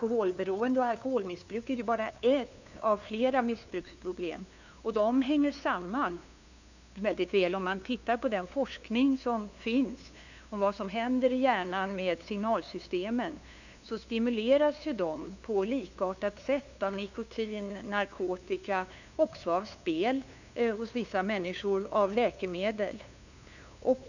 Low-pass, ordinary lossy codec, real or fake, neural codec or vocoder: 7.2 kHz; none; fake; codec, 16 kHz, 2 kbps, FunCodec, trained on LibriTTS, 25 frames a second